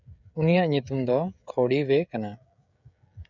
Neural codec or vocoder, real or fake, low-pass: codec, 16 kHz, 16 kbps, FreqCodec, smaller model; fake; 7.2 kHz